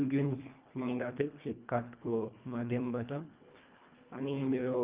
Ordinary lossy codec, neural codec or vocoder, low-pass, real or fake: Opus, 24 kbps; codec, 24 kHz, 1.5 kbps, HILCodec; 3.6 kHz; fake